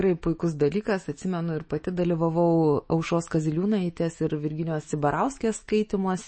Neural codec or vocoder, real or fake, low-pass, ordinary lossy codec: autoencoder, 48 kHz, 128 numbers a frame, DAC-VAE, trained on Japanese speech; fake; 9.9 kHz; MP3, 32 kbps